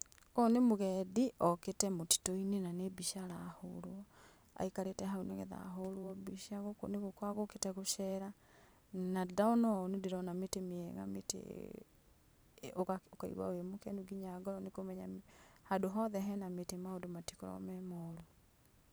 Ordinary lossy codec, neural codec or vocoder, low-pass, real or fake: none; vocoder, 44.1 kHz, 128 mel bands every 512 samples, BigVGAN v2; none; fake